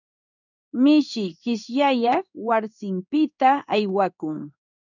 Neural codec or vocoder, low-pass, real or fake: codec, 16 kHz in and 24 kHz out, 1 kbps, XY-Tokenizer; 7.2 kHz; fake